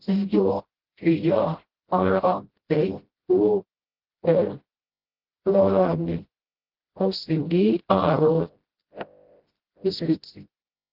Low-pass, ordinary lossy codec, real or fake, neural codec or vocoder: 5.4 kHz; Opus, 16 kbps; fake; codec, 16 kHz, 0.5 kbps, FreqCodec, smaller model